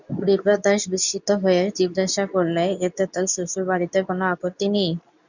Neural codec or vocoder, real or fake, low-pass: codec, 24 kHz, 0.9 kbps, WavTokenizer, medium speech release version 2; fake; 7.2 kHz